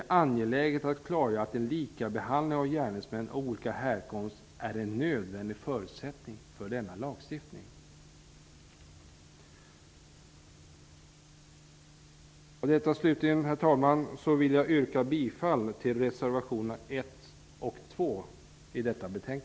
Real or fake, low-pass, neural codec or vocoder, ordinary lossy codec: real; none; none; none